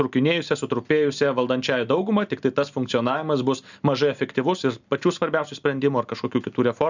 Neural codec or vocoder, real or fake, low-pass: none; real; 7.2 kHz